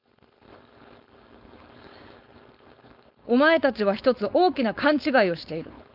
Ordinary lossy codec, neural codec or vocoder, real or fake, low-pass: none; codec, 16 kHz, 4.8 kbps, FACodec; fake; 5.4 kHz